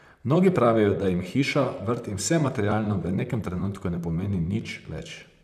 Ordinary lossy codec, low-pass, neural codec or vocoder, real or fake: none; 14.4 kHz; vocoder, 44.1 kHz, 128 mel bands, Pupu-Vocoder; fake